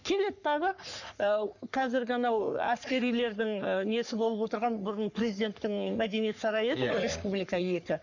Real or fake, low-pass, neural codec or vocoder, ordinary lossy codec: fake; 7.2 kHz; codec, 44.1 kHz, 3.4 kbps, Pupu-Codec; none